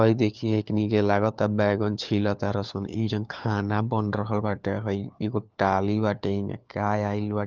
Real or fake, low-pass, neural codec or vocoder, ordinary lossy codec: fake; 7.2 kHz; codec, 16 kHz, 4 kbps, FunCodec, trained on LibriTTS, 50 frames a second; Opus, 32 kbps